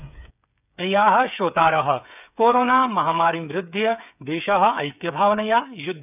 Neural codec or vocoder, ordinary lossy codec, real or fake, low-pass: codec, 16 kHz, 8 kbps, FreqCodec, smaller model; none; fake; 3.6 kHz